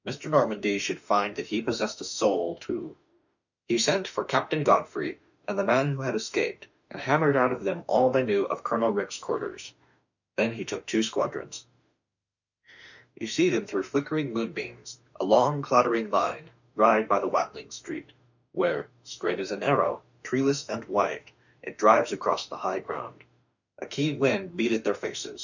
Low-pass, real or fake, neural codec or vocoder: 7.2 kHz; fake; autoencoder, 48 kHz, 32 numbers a frame, DAC-VAE, trained on Japanese speech